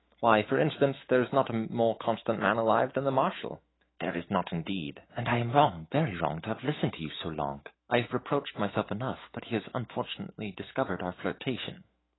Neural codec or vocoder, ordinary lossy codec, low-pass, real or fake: autoencoder, 48 kHz, 128 numbers a frame, DAC-VAE, trained on Japanese speech; AAC, 16 kbps; 7.2 kHz; fake